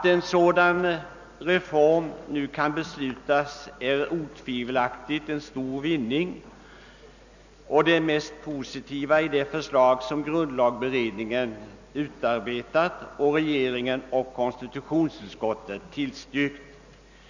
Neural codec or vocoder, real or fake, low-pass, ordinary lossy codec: none; real; 7.2 kHz; none